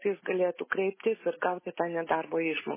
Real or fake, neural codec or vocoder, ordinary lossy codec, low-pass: real; none; MP3, 16 kbps; 3.6 kHz